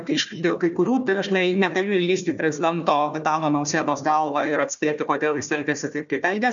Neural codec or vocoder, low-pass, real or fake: codec, 16 kHz, 1 kbps, FunCodec, trained on Chinese and English, 50 frames a second; 7.2 kHz; fake